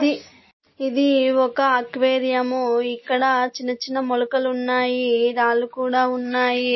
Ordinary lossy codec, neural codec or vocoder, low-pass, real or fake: MP3, 24 kbps; none; 7.2 kHz; real